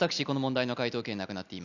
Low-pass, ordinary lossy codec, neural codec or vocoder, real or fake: 7.2 kHz; none; none; real